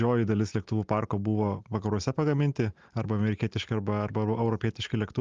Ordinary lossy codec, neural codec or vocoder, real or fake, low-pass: Opus, 32 kbps; none; real; 7.2 kHz